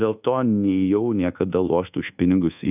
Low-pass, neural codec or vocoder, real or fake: 3.6 kHz; codec, 24 kHz, 1.2 kbps, DualCodec; fake